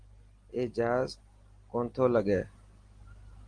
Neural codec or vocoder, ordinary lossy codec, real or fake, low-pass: none; Opus, 24 kbps; real; 9.9 kHz